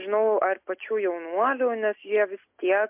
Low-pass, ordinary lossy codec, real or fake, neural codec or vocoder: 3.6 kHz; MP3, 32 kbps; real; none